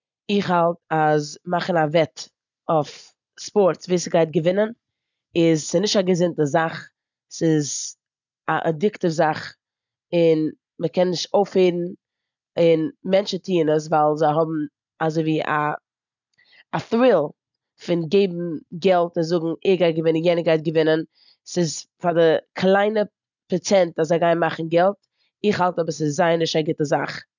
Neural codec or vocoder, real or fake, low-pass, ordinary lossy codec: none; real; 7.2 kHz; none